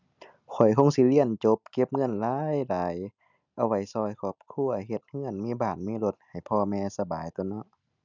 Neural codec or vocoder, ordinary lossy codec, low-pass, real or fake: none; none; 7.2 kHz; real